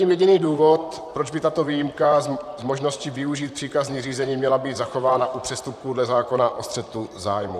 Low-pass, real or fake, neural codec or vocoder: 14.4 kHz; fake; vocoder, 44.1 kHz, 128 mel bands, Pupu-Vocoder